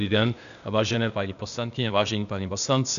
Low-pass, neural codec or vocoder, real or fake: 7.2 kHz; codec, 16 kHz, 0.8 kbps, ZipCodec; fake